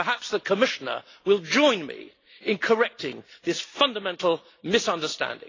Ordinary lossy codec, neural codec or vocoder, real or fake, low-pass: AAC, 32 kbps; none; real; 7.2 kHz